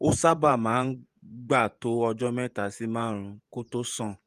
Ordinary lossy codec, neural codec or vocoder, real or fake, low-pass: Opus, 32 kbps; vocoder, 48 kHz, 128 mel bands, Vocos; fake; 14.4 kHz